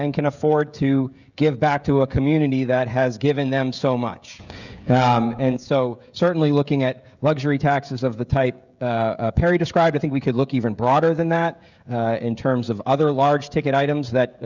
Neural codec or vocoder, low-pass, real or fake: codec, 16 kHz, 16 kbps, FreqCodec, smaller model; 7.2 kHz; fake